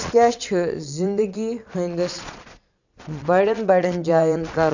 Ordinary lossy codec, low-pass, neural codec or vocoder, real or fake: none; 7.2 kHz; vocoder, 22.05 kHz, 80 mel bands, Vocos; fake